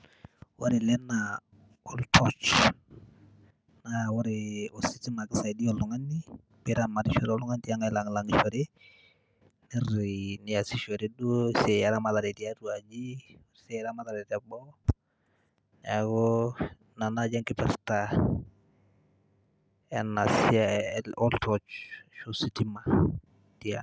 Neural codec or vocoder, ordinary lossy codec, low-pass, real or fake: none; none; none; real